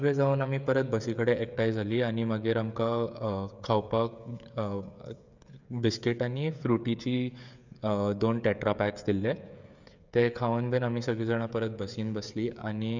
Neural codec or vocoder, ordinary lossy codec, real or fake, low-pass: codec, 16 kHz, 16 kbps, FreqCodec, smaller model; none; fake; 7.2 kHz